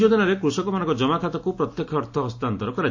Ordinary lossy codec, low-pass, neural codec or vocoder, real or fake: AAC, 48 kbps; 7.2 kHz; none; real